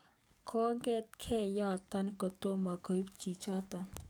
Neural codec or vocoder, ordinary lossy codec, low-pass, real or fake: codec, 44.1 kHz, 7.8 kbps, DAC; none; none; fake